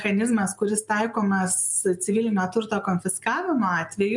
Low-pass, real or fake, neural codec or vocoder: 10.8 kHz; real; none